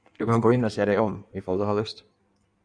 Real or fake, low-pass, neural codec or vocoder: fake; 9.9 kHz; codec, 16 kHz in and 24 kHz out, 1.1 kbps, FireRedTTS-2 codec